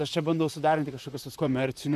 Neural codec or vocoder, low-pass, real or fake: vocoder, 44.1 kHz, 128 mel bands, Pupu-Vocoder; 14.4 kHz; fake